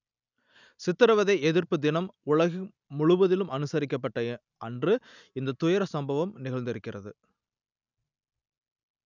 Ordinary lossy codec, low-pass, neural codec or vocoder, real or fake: none; 7.2 kHz; none; real